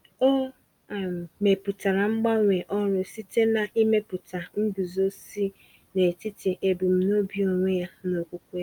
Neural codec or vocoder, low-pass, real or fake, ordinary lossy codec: none; 19.8 kHz; real; Opus, 32 kbps